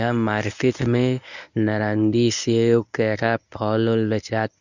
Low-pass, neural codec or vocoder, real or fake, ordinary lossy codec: 7.2 kHz; codec, 24 kHz, 0.9 kbps, WavTokenizer, medium speech release version 1; fake; none